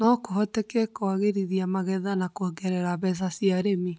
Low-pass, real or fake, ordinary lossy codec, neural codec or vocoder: none; real; none; none